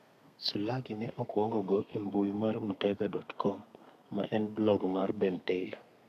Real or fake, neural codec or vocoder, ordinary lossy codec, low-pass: fake; codec, 32 kHz, 1.9 kbps, SNAC; none; 14.4 kHz